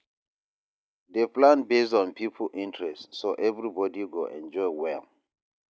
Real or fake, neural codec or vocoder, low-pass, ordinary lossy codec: real; none; none; none